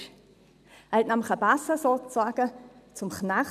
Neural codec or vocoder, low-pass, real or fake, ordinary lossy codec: none; 14.4 kHz; real; none